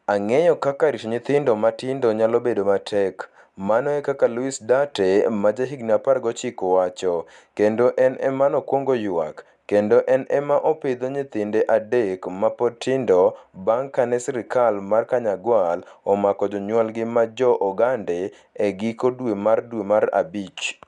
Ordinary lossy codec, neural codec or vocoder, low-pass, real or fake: none; none; 10.8 kHz; real